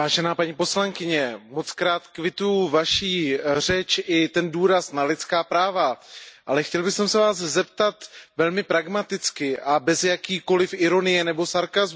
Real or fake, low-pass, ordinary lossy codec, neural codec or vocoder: real; none; none; none